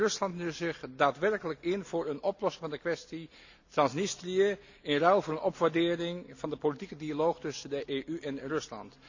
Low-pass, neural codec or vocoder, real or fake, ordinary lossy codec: 7.2 kHz; none; real; none